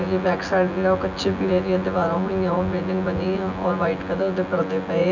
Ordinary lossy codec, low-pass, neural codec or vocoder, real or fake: none; 7.2 kHz; vocoder, 24 kHz, 100 mel bands, Vocos; fake